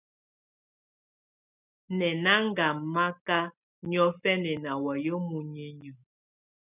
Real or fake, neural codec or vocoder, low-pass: real; none; 3.6 kHz